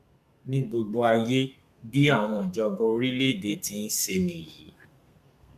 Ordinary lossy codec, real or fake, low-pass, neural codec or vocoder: MP3, 96 kbps; fake; 14.4 kHz; codec, 32 kHz, 1.9 kbps, SNAC